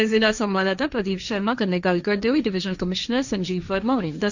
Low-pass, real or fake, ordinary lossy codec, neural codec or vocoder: 7.2 kHz; fake; none; codec, 16 kHz, 1.1 kbps, Voila-Tokenizer